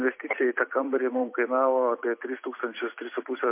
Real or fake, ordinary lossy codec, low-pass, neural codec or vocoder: fake; MP3, 24 kbps; 3.6 kHz; vocoder, 44.1 kHz, 128 mel bands every 256 samples, BigVGAN v2